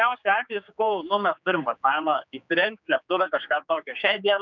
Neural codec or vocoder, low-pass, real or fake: codec, 16 kHz, 4 kbps, X-Codec, HuBERT features, trained on general audio; 7.2 kHz; fake